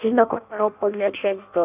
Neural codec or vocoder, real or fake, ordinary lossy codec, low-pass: codec, 16 kHz in and 24 kHz out, 0.6 kbps, FireRedTTS-2 codec; fake; none; 3.6 kHz